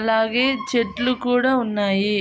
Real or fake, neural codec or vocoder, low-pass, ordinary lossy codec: real; none; none; none